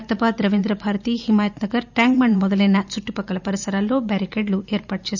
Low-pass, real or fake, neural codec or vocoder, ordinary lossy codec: 7.2 kHz; fake; vocoder, 44.1 kHz, 128 mel bands every 512 samples, BigVGAN v2; none